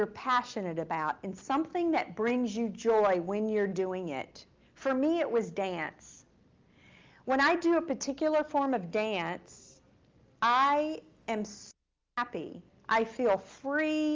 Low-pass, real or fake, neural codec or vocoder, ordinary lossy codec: 7.2 kHz; real; none; Opus, 32 kbps